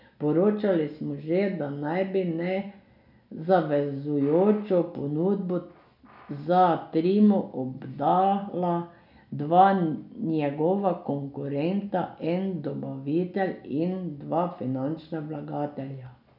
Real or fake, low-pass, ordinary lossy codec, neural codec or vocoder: real; 5.4 kHz; none; none